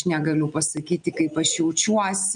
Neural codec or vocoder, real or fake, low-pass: none; real; 9.9 kHz